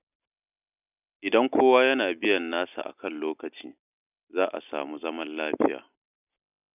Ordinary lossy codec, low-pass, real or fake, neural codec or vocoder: none; 3.6 kHz; real; none